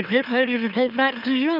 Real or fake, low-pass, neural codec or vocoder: fake; 5.4 kHz; autoencoder, 44.1 kHz, a latent of 192 numbers a frame, MeloTTS